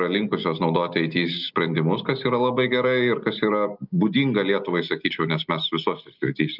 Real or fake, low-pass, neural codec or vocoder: real; 5.4 kHz; none